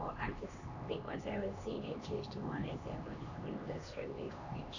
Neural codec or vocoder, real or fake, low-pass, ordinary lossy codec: codec, 16 kHz, 2 kbps, X-Codec, HuBERT features, trained on LibriSpeech; fake; 7.2 kHz; none